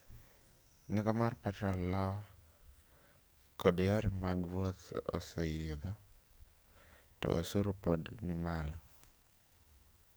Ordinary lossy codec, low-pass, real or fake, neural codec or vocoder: none; none; fake; codec, 44.1 kHz, 2.6 kbps, SNAC